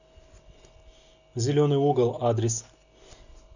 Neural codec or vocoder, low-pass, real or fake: none; 7.2 kHz; real